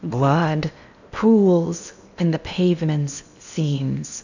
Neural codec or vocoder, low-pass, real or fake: codec, 16 kHz in and 24 kHz out, 0.8 kbps, FocalCodec, streaming, 65536 codes; 7.2 kHz; fake